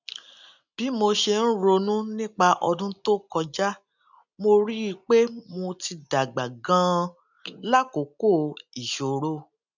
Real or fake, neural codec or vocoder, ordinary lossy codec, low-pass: real; none; none; 7.2 kHz